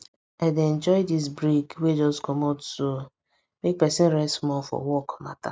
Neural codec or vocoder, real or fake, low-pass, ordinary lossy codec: none; real; none; none